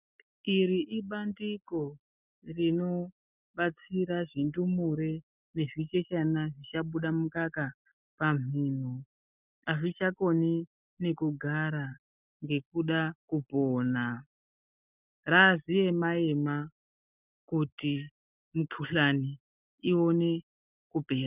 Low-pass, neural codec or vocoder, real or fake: 3.6 kHz; none; real